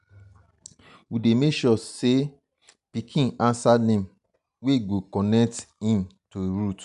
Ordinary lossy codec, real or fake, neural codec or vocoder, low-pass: none; real; none; 10.8 kHz